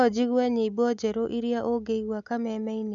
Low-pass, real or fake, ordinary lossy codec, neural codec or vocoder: 7.2 kHz; real; none; none